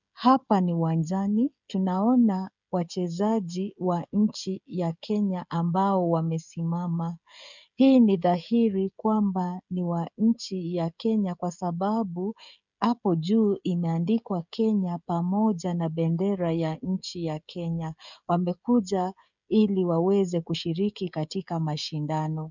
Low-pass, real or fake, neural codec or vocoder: 7.2 kHz; fake; codec, 16 kHz, 16 kbps, FreqCodec, smaller model